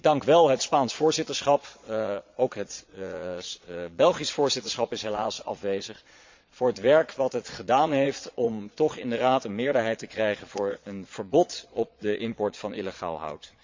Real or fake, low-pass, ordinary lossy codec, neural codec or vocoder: fake; 7.2 kHz; MP3, 64 kbps; vocoder, 22.05 kHz, 80 mel bands, Vocos